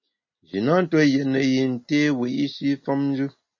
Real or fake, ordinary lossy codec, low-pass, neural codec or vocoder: real; MP3, 32 kbps; 7.2 kHz; none